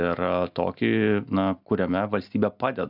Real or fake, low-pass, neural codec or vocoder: real; 5.4 kHz; none